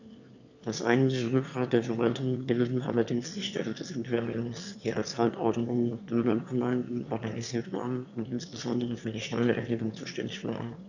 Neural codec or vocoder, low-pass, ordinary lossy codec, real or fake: autoencoder, 22.05 kHz, a latent of 192 numbers a frame, VITS, trained on one speaker; 7.2 kHz; none; fake